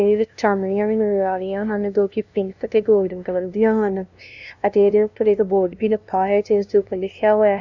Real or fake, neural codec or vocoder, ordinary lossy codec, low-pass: fake; codec, 16 kHz, 0.5 kbps, FunCodec, trained on LibriTTS, 25 frames a second; none; 7.2 kHz